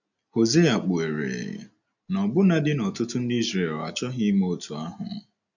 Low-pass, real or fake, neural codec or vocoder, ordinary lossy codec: 7.2 kHz; real; none; none